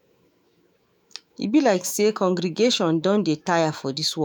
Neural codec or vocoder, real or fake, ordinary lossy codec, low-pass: autoencoder, 48 kHz, 128 numbers a frame, DAC-VAE, trained on Japanese speech; fake; none; none